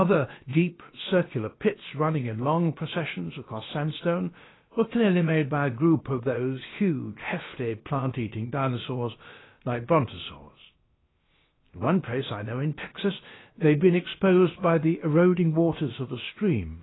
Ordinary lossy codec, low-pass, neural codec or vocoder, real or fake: AAC, 16 kbps; 7.2 kHz; codec, 16 kHz, about 1 kbps, DyCAST, with the encoder's durations; fake